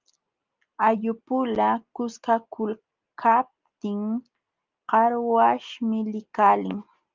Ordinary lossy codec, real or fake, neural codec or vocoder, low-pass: Opus, 32 kbps; real; none; 7.2 kHz